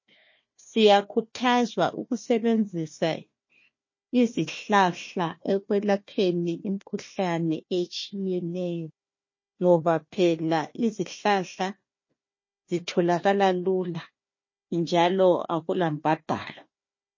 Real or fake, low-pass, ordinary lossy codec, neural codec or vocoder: fake; 7.2 kHz; MP3, 32 kbps; codec, 16 kHz, 1 kbps, FunCodec, trained on Chinese and English, 50 frames a second